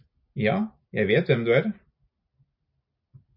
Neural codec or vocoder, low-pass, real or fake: none; 5.4 kHz; real